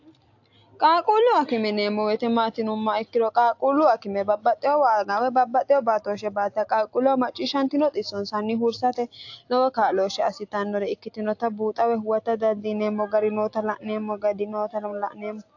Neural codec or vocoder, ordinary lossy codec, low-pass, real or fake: none; AAC, 48 kbps; 7.2 kHz; real